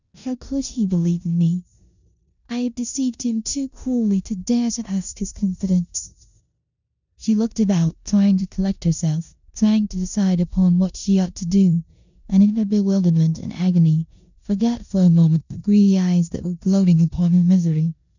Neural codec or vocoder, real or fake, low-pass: codec, 16 kHz in and 24 kHz out, 0.9 kbps, LongCat-Audio-Codec, four codebook decoder; fake; 7.2 kHz